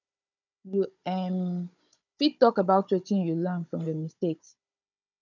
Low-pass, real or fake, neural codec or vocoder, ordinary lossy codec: 7.2 kHz; fake; codec, 16 kHz, 16 kbps, FunCodec, trained on Chinese and English, 50 frames a second; none